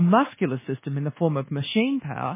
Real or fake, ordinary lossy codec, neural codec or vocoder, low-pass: fake; MP3, 16 kbps; codec, 24 kHz, 1.2 kbps, DualCodec; 3.6 kHz